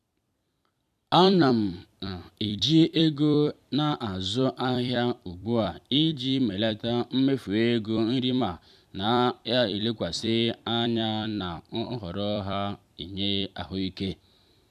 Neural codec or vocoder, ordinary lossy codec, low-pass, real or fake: vocoder, 44.1 kHz, 128 mel bands every 256 samples, BigVGAN v2; none; 14.4 kHz; fake